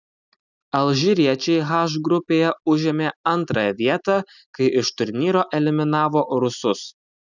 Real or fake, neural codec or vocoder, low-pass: real; none; 7.2 kHz